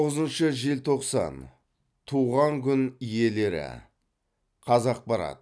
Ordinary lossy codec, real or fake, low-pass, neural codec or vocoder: none; real; none; none